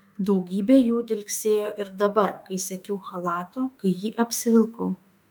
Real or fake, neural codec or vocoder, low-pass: fake; autoencoder, 48 kHz, 32 numbers a frame, DAC-VAE, trained on Japanese speech; 19.8 kHz